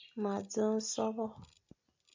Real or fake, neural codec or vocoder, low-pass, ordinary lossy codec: real; none; 7.2 kHz; MP3, 64 kbps